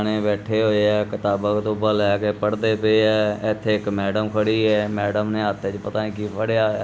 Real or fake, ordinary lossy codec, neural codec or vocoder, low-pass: real; none; none; none